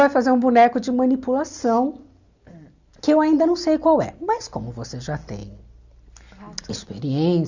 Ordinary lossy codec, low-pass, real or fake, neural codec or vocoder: Opus, 64 kbps; 7.2 kHz; real; none